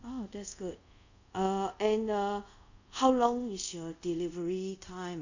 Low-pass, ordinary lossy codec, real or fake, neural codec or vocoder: 7.2 kHz; none; fake; codec, 24 kHz, 0.5 kbps, DualCodec